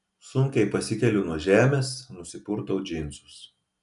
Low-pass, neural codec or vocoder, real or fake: 10.8 kHz; none; real